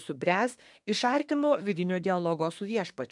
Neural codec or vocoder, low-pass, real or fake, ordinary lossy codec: codec, 24 kHz, 1 kbps, SNAC; 10.8 kHz; fake; MP3, 96 kbps